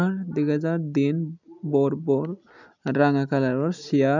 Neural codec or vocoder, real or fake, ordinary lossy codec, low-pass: none; real; none; 7.2 kHz